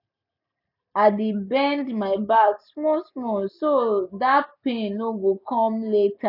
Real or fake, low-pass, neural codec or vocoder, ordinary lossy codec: real; 5.4 kHz; none; none